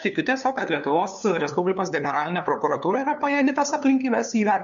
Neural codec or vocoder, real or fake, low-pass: codec, 16 kHz, 2 kbps, FunCodec, trained on LibriTTS, 25 frames a second; fake; 7.2 kHz